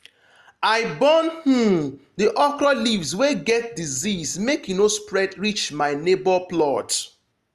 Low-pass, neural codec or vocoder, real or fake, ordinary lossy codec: 14.4 kHz; none; real; Opus, 32 kbps